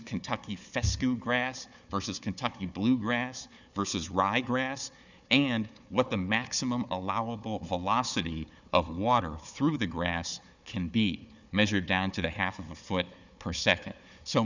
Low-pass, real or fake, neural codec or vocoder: 7.2 kHz; fake; codec, 16 kHz, 4 kbps, FunCodec, trained on Chinese and English, 50 frames a second